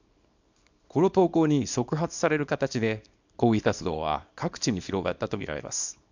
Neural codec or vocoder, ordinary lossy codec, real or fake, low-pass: codec, 24 kHz, 0.9 kbps, WavTokenizer, small release; MP3, 64 kbps; fake; 7.2 kHz